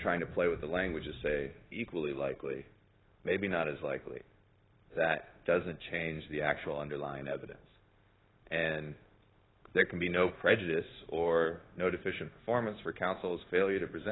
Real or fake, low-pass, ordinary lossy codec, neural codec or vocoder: fake; 7.2 kHz; AAC, 16 kbps; codec, 16 kHz, 0.9 kbps, LongCat-Audio-Codec